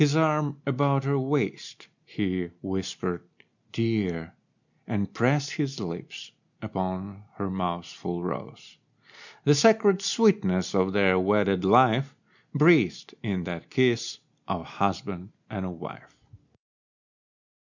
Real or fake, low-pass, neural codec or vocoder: real; 7.2 kHz; none